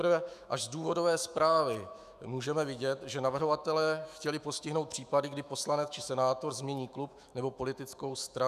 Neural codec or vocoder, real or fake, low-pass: autoencoder, 48 kHz, 128 numbers a frame, DAC-VAE, trained on Japanese speech; fake; 14.4 kHz